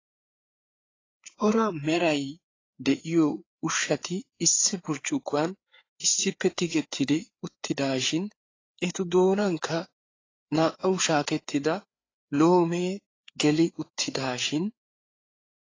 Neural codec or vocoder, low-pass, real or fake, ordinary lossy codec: codec, 16 kHz, 4 kbps, FreqCodec, larger model; 7.2 kHz; fake; AAC, 32 kbps